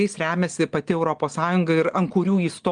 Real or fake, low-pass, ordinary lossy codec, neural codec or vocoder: real; 9.9 kHz; Opus, 24 kbps; none